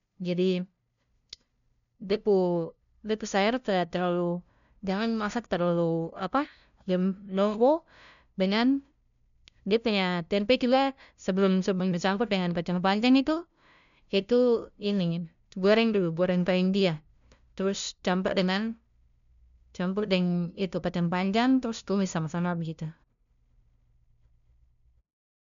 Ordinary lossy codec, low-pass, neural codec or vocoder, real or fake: none; 7.2 kHz; codec, 16 kHz, 0.5 kbps, FunCodec, trained on LibriTTS, 25 frames a second; fake